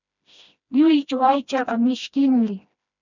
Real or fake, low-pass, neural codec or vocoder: fake; 7.2 kHz; codec, 16 kHz, 1 kbps, FreqCodec, smaller model